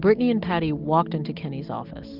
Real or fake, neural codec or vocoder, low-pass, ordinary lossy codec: real; none; 5.4 kHz; Opus, 24 kbps